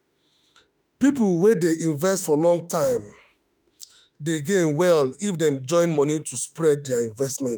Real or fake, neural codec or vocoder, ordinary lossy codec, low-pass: fake; autoencoder, 48 kHz, 32 numbers a frame, DAC-VAE, trained on Japanese speech; none; none